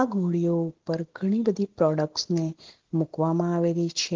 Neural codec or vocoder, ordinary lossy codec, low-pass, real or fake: none; Opus, 16 kbps; 7.2 kHz; real